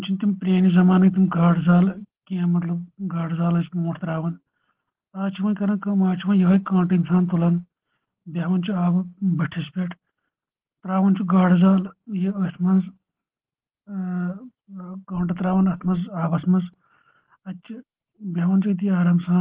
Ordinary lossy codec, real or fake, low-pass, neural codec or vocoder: Opus, 16 kbps; real; 3.6 kHz; none